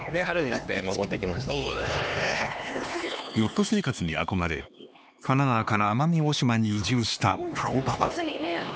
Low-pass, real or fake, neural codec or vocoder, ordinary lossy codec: none; fake; codec, 16 kHz, 2 kbps, X-Codec, HuBERT features, trained on LibriSpeech; none